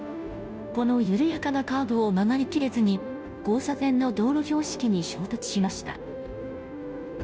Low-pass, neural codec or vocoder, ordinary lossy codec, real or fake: none; codec, 16 kHz, 0.5 kbps, FunCodec, trained on Chinese and English, 25 frames a second; none; fake